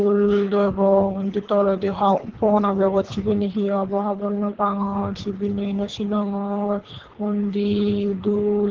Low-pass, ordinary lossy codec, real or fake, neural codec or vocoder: 7.2 kHz; Opus, 16 kbps; fake; codec, 24 kHz, 3 kbps, HILCodec